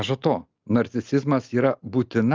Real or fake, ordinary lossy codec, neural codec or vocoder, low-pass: real; Opus, 24 kbps; none; 7.2 kHz